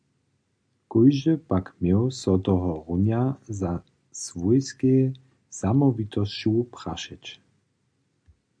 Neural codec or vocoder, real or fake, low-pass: none; real; 9.9 kHz